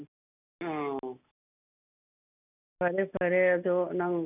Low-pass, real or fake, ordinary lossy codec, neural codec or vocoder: 3.6 kHz; real; none; none